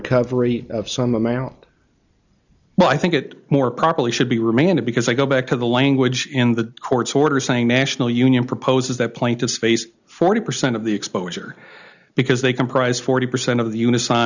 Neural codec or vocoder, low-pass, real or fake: none; 7.2 kHz; real